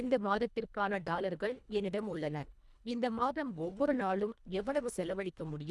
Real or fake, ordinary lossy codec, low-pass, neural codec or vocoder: fake; none; none; codec, 24 kHz, 1.5 kbps, HILCodec